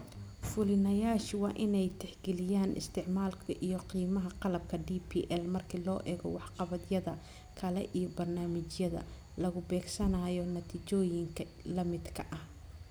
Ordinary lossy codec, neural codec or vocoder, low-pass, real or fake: none; none; none; real